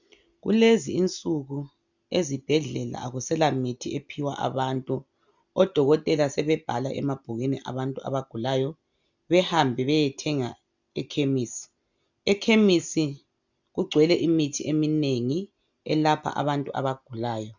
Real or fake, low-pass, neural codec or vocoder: real; 7.2 kHz; none